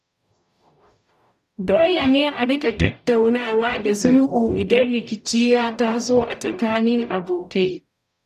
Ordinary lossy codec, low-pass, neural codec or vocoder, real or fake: none; 14.4 kHz; codec, 44.1 kHz, 0.9 kbps, DAC; fake